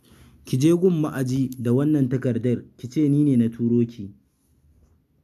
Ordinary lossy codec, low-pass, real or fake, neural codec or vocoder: AAC, 96 kbps; 14.4 kHz; real; none